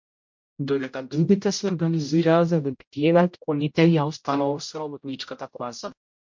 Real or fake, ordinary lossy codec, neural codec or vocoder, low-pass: fake; MP3, 48 kbps; codec, 16 kHz, 0.5 kbps, X-Codec, HuBERT features, trained on general audio; 7.2 kHz